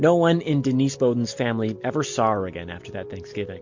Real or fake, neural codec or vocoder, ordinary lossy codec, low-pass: real; none; MP3, 48 kbps; 7.2 kHz